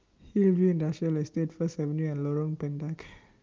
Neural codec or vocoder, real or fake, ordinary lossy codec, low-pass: none; real; Opus, 32 kbps; 7.2 kHz